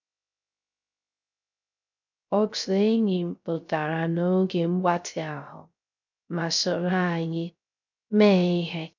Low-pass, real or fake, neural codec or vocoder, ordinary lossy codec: 7.2 kHz; fake; codec, 16 kHz, 0.3 kbps, FocalCodec; none